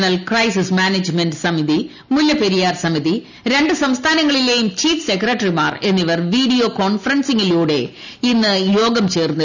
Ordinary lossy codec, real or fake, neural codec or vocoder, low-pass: none; real; none; 7.2 kHz